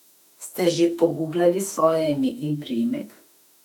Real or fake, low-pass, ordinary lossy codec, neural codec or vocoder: fake; 19.8 kHz; none; autoencoder, 48 kHz, 32 numbers a frame, DAC-VAE, trained on Japanese speech